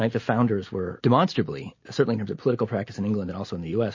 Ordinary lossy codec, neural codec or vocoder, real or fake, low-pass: MP3, 32 kbps; none; real; 7.2 kHz